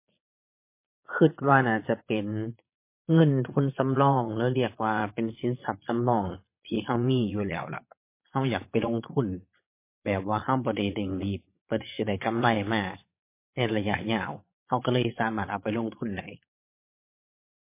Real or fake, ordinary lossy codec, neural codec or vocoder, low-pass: fake; MP3, 24 kbps; vocoder, 22.05 kHz, 80 mel bands, Vocos; 3.6 kHz